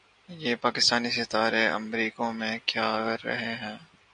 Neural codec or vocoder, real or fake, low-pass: none; real; 9.9 kHz